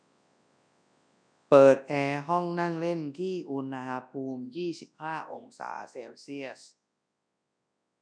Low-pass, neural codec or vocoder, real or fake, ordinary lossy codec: 9.9 kHz; codec, 24 kHz, 0.9 kbps, WavTokenizer, large speech release; fake; none